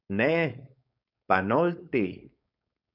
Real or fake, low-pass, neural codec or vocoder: fake; 5.4 kHz; codec, 16 kHz, 4.8 kbps, FACodec